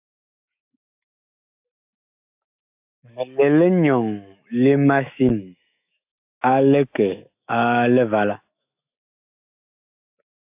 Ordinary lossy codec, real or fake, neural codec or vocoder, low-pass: AAC, 32 kbps; fake; autoencoder, 48 kHz, 128 numbers a frame, DAC-VAE, trained on Japanese speech; 3.6 kHz